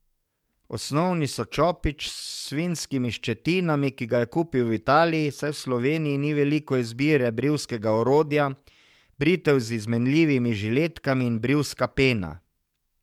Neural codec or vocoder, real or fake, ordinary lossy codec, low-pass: codec, 44.1 kHz, 7.8 kbps, DAC; fake; MP3, 96 kbps; 19.8 kHz